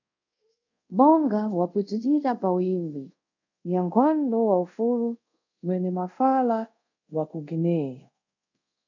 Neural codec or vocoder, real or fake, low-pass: codec, 24 kHz, 0.5 kbps, DualCodec; fake; 7.2 kHz